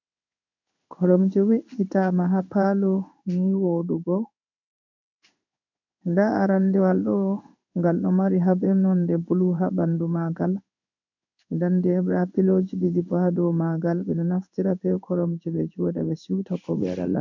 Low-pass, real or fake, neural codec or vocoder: 7.2 kHz; fake; codec, 16 kHz in and 24 kHz out, 1 kbps, XY-Tokenizer